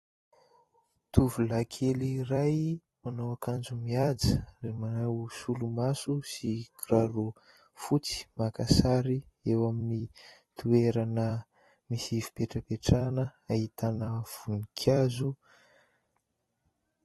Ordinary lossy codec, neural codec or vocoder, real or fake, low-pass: AAC, 32 kbps; vocoder, 44.1 kHz, 128 mel bands every 256 samples, BigVGAN v2; fake; 19.8 kHz